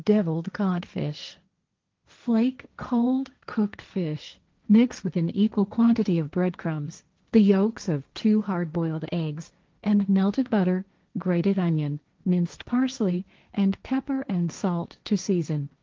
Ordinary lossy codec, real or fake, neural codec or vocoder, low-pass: Opus, 32 kbps; fake; codec, 16 kHz, 1.1 kbps, Voila-Tokenizer; 7.2 kHz